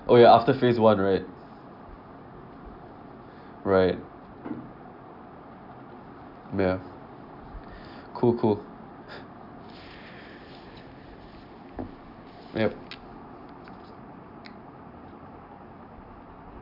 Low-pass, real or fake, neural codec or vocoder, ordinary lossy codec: 5.4 kHz; real; none; none